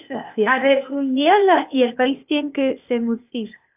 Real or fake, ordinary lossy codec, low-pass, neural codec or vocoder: fake; AAC, 32 kbps; 3.6 kHz; codec, 16 kHz, 0.8 kbps, ZipCodec